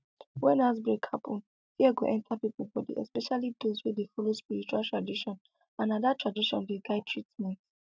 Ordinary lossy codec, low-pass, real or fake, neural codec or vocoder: none; none; real; none